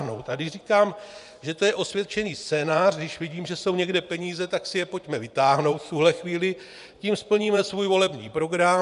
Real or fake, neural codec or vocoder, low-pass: fake; vocoder, 24 kHz, 100 mel bands, Vocos; 10.8 kHz